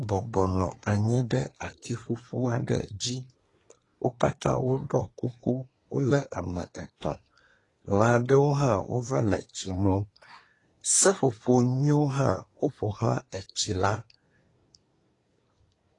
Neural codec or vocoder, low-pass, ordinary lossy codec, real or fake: codec, 24 kHz, 1 kbps, SNAC; 10.8 kHz; AAC, 32 kbps; fake